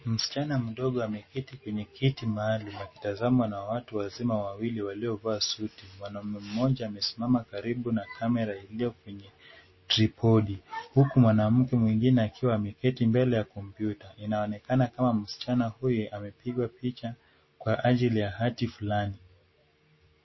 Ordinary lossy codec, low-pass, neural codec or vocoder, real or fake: MP3, 24 kbps; 7.2 kHz; none; real